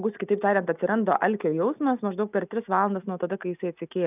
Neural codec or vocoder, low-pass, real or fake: none; 3.6 kHz; real